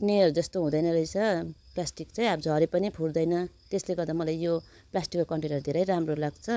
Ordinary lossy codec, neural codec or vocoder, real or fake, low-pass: none; codec, 16 kHz, 16 kbps, FunCodec, trained on LibriTTS, 50 frames a second; fake; none